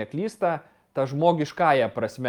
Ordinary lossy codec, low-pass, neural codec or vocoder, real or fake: Opus, 32 kbps; 19.8 kHz; none; real